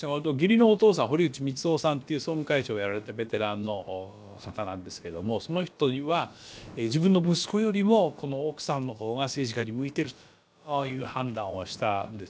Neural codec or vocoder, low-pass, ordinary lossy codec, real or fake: codec, 16 kHz, about 1 kbps, DyCAST, with the encoder's durations; none; none; fake